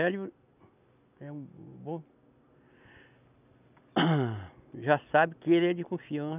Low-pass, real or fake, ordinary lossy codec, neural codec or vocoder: 3.6 kHz; real; none; none